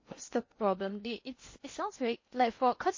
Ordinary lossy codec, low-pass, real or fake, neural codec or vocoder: MP3, 32 kbps; 7.2 kHz; fake; codec, 16 kHz in and 24 kHz out, 0.8 kbps, FocalCodec, streaming, 65536 codes